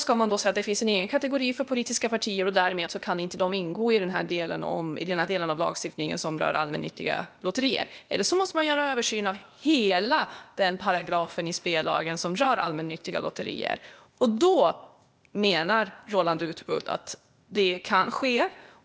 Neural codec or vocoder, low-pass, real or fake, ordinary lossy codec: codec, 16 kHz, 0.8 kbps, ZipCodec; none; fake; none